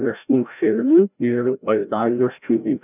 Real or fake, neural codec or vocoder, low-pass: fake; codec, 16 kHz, 0.5 kbps, FreqCodec, larger model; 3.6 kHz